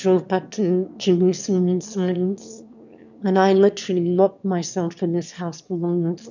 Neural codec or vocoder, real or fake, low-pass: autoencoder, 22.05 kHz, a latent of 192 numbers a frame, VITS, trained on one speaker; fake; 7.2 kHz